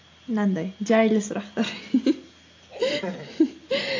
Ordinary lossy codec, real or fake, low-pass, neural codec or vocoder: AAC, 48 kbps; real; 7.2 kHz; none